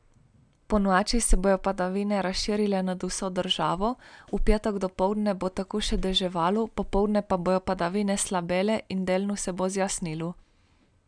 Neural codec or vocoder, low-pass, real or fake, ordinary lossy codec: none; 9.9 kHz; real; none